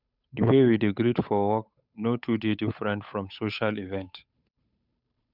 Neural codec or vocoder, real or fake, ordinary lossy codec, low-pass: codec, 16 kHz, 8 kbps, FunCodec, trained on Chinese and English, 25 frames a second; fake; none; 5.4 kHz